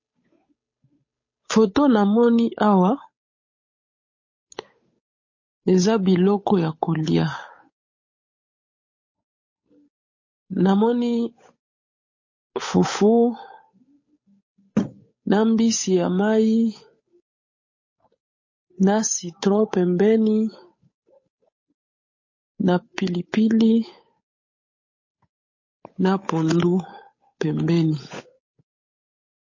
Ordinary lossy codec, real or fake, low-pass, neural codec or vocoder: MP3, 32 kbps; fake; 7.2 kHz; codec, 16 kHz, 8 kbps, FunCodec, trained on Chinese and English, 25 frames a second